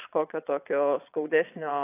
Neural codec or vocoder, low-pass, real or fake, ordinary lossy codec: vocoder, 22.05 kHz, 80 mel bands, Vocos; 3.6 kHz; fake; AAC, 24 kbps